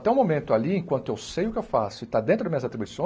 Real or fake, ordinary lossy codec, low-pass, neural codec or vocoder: real; none; none; none